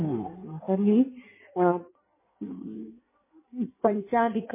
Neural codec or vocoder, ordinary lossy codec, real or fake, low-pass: codec, 16 kHz in and 24 kHz out, 1.1 kbps, FireRedTTS-2 codec; MP3, 16 kbps; fake; 3.6 kHz